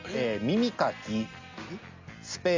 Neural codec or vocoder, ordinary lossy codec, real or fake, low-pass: none; MP3, 64 kbps; real; 7.2 kHz